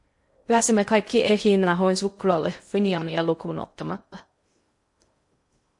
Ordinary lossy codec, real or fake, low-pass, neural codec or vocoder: MP3, 48 kbps; fake; 10.8 kHz; codec, 16 kHz in and 24 kHz out, 0.6 kbps, FocalCodec, streaming, 2048 codes